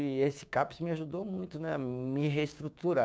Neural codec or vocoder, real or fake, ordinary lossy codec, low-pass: codec, 16 kHz, 6 kbps, DAC; fake; none; none